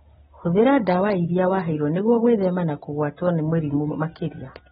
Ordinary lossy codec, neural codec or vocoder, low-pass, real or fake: AAC, 16 kbps; none; 19.8 kHz; real